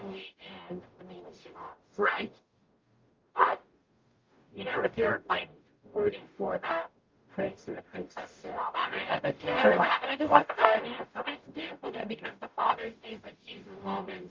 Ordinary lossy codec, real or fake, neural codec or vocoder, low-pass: Opus, 24 kbps; fake; codec, 44.1 kHz, 0.9 kbps, DAC; 7.2 kHz